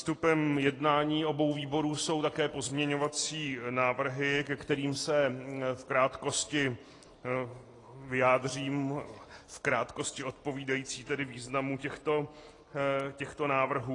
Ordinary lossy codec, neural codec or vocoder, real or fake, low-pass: AAC, 32 kbps; none; real; 10.8 kHz